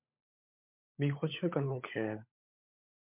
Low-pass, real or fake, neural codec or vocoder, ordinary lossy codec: 3.6 kHz; fake; codec, 16 kHz, 16 kbps, FunCodec, trained on LibriTTS, 50 frames a second; MP3, 32 kbps